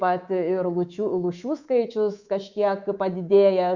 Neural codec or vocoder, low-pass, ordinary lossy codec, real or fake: codec, 24 kHz, 3.1 kbps, DualCodec; 7.2 kHz; Opus, 64 kbps; fake